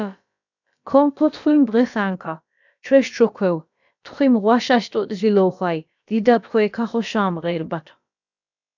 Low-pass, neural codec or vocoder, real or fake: 7.2 kHz; codec, 16 kHz, about 1 kbps, DyCAST, with the encoder's durations; fake